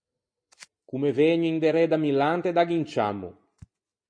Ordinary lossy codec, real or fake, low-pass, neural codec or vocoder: MP3, 48 kbps; real; 9.9 kHz; none